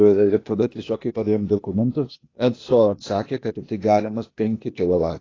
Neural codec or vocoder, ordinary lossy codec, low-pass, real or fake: codec, 16 kHz, 0.8 kbps, ZipCodec; AAC, 32 kbps; 7.2 kHz; fake